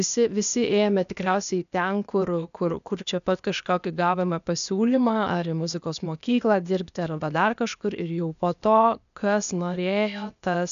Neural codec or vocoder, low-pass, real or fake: codec, 16 kHz, 0.8 kbps, ZipCodec; 7.2 kHz; fake